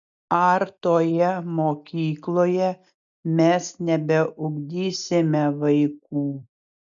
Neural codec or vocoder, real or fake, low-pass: none; real; 7.2 kHz